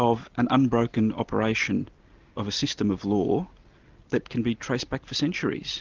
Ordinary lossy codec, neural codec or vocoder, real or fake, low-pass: Opus, 32 kbps; none; real; 7.2 kHz